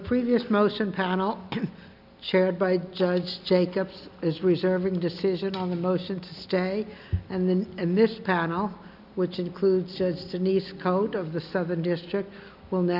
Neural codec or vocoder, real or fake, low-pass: none; real; 5.4 kHz